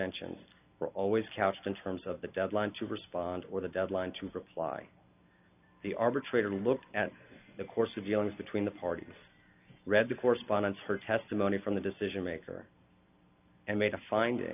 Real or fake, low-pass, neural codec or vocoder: real; 3.6 kHz; none